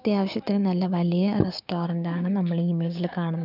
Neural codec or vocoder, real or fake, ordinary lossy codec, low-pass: codec, 16 kHz, 6 kbps, DAC; fake; none; 5.4 kHz